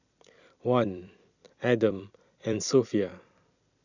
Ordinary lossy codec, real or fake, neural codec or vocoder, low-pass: none; fake; vocoder, 44.1 kHz, 128 mel bands every 256 samples, BigVGAN v2; 7.2 kHz